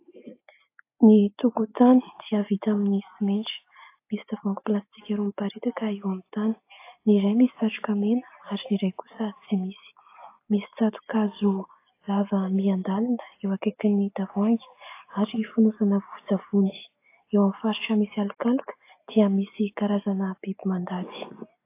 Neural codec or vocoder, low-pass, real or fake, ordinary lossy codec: none; 3.6 kHz; real; AAC, 24 kbps